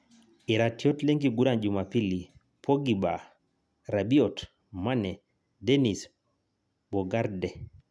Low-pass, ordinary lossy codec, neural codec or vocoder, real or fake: none; none; none; real